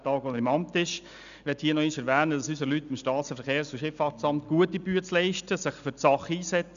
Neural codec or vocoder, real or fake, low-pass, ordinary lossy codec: none; real; 7.2 kHz; none